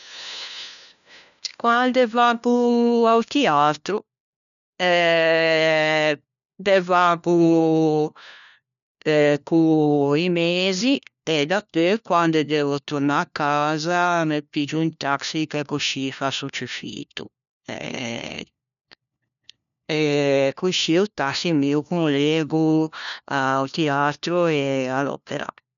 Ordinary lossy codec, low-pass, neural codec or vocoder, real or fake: none; 7.2 kHz; codec, 16 kHz, 1 kbps, FunCodec, trained on LibriTTS, 50 frames a second; fake